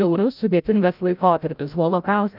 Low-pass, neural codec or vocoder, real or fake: 5.4 kHz; codec, 16 kHz, 0.5 kbps, FreqCodec, larger model; fake